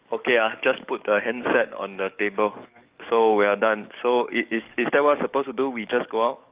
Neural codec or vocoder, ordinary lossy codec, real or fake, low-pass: codec, 44.1 kHz, 7.8 kbps, DAC; Opus, 24 kbps; fake; 3.6 kHz